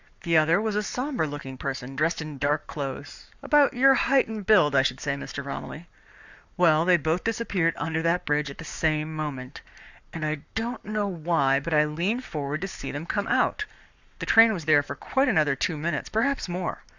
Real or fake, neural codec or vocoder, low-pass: fake; codec, 44.1 kHz, 7.8 kbps, Pupu-Codec; 7.2 kHz